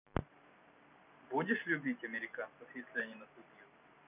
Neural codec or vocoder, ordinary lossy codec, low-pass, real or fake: none; none; 3.6 kHz; real